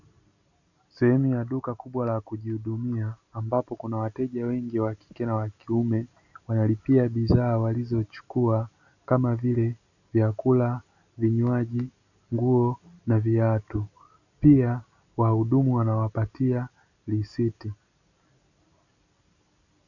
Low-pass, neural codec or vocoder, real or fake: 7.2 kHz; none; real